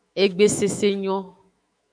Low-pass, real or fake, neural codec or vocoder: 9.9 kHz; fake; autoencoder, 48 kHz, 128 numbers a frame, DAC-VAE, trained on Japanese speech